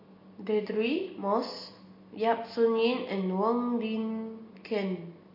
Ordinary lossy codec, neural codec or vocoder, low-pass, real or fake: MP3, 32 kbps; none; 5.4 kHz; real